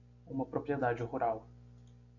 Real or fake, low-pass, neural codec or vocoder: real; 7.2 kHz; none